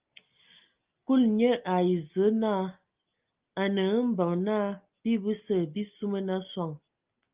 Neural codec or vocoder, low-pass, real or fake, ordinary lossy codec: none; 3.6 kHz; real; Opus, 24 kbps